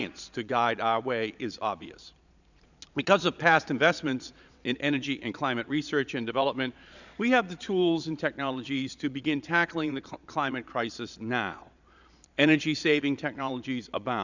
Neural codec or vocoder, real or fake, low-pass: vocoder, 22.05 kHz, 80 mel bands, Vocos; fake; 7.2 kHz